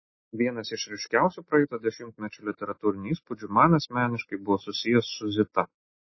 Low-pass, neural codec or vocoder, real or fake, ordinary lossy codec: 7.2 kHz; none; real; MP3, 24 kbps